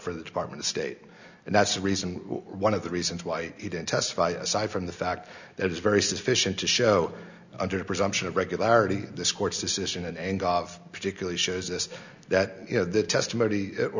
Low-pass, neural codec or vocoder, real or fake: 7.2 kHz; none; real